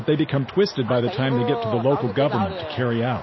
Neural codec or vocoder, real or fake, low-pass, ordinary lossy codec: none; real; 7.2 kHz; MP3, 24 kbps